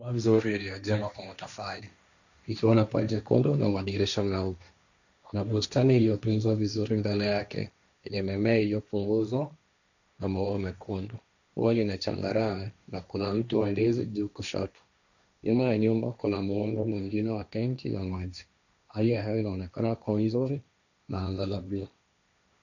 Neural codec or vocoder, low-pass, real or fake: codec, 16 kHz, 1.1 kbps, Voila-Tokenizer; 7.2 kHz; fake